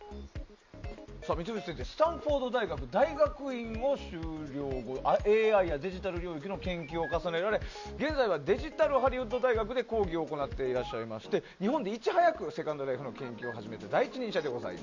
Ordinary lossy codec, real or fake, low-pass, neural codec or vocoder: MP3, 64 kbps; real; 7.2 kHz; none